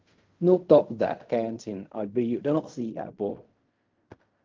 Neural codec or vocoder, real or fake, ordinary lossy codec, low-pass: codec, 16 kHz in and 24 kHz out, 0.4 kbps, LongCat-Audio-Codec, fine tuned four codebook decoder; fake; Opus, 24 kbps; 7.2 kHz